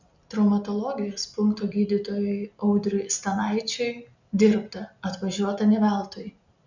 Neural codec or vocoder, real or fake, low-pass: none; real; 7.2 kHz